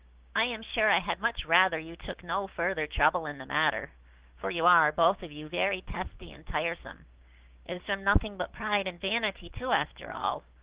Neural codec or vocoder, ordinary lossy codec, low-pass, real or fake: codec, 16 kHz, 6 kbps, DAC; Opus, 16 kbps; 3.6 kHz; fake